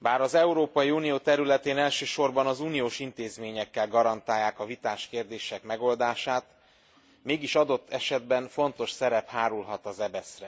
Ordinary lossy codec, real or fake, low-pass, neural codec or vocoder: none; real; none; none